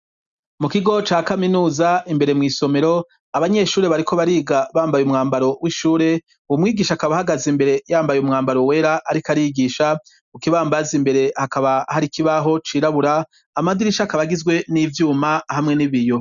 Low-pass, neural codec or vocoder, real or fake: 7.2 kHz; none; real